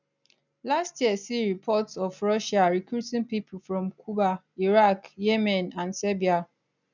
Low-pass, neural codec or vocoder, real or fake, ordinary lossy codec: 7.2 kHz; none; real; none